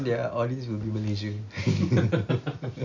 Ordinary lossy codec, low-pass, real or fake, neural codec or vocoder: none; 7.2 kHz; real; none